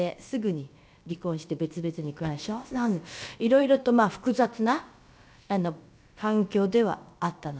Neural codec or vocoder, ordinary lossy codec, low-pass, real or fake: codec, 16 kHz, about 1 kbps, DyCAST, with the encoder's durations; none; none; fake